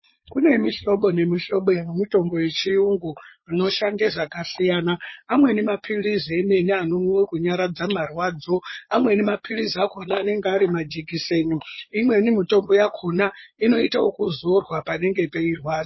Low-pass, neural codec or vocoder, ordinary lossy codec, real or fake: 7.2 kHz; vocoder, 22.05 kHz, 80 mel bands, Vocos; MP3, 24 kbps; fake